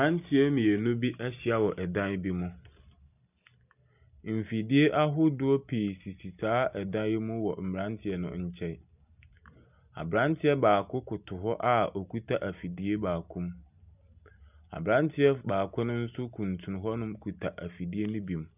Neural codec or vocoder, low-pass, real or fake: none; 3.6 kHz; real